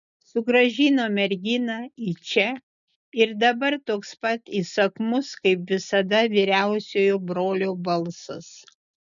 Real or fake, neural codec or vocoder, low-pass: real; none; 7.2 kHz